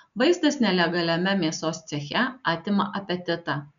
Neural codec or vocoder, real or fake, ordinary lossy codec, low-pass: none; real; MP3, 96 kbps; 7.2 kHz